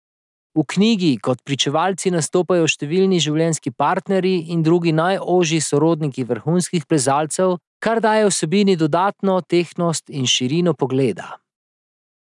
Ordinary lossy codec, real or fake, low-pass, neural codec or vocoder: none; real; 10.8 kHz; none